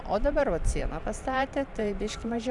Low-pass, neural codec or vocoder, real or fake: 10.8 kHz; vocoder, 44.1 kHz, 128 mel bands every 512 samples, BigVGAN v2; fake